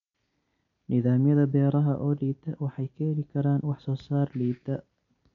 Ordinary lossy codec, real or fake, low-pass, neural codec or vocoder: none; real; 7.2 kHz; none